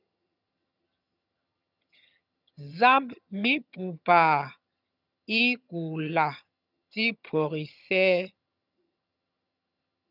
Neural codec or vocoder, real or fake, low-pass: vocoder, 22.05 kHz, 80 mel bands, HiFi-GAN; fake; 5.4 kHz